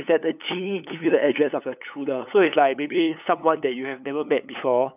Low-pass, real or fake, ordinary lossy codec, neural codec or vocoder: 3.6 kHz; fake; none; codec, 16 kHz, 8 kbps, FunCodec, trained on LibriTTS, 25 frames a second